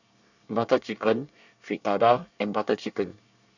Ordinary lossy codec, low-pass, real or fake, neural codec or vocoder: none; 7.2 kHz; fake; codec, 24 kHz, 1 kbps, SNAC